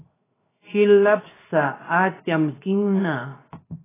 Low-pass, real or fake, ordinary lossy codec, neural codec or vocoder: 3.6 kHz; fake; AAC, 16 kbps; codec, 16 kHz, 0.7 kbps, FocalCodec